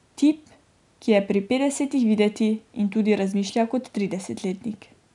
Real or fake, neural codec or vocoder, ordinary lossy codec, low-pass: real; none; none; 10.8 kHz